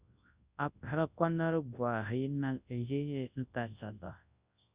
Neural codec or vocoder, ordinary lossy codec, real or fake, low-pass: codec, 24 kHz, 0.9 kbps, WavTokenizer, large speech release; Opus, 64 kbps; fake; 3.6 kHz